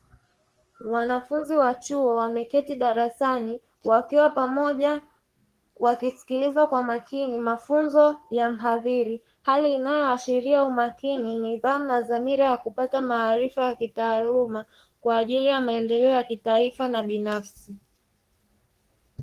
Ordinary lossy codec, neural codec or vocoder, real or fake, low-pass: Opus, 24 kbps; codec, 44.1 kHz, 3.4 kbps, Pupu-Codec; fake; 14.4 kHz